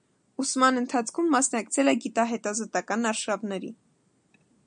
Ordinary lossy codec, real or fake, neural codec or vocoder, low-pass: MP3, 96 kbps; real; none; 9.9 kHz